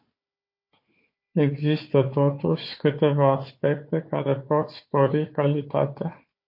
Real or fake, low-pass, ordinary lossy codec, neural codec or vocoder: fake; 5.4 kHz; MP3, 32 kbps; codec, 16 kHz, 4 kbps, FunCodec, trained on Chinese and English, 50 frames a second